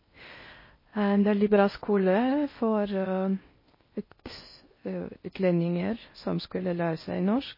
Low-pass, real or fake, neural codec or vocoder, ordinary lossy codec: 5.4 kHz; fake; codec, 16 kHz in and 24 kHz out, 0.6 kbps, FocalCodec, streaming, 4096 codes; MP3, 24 kbps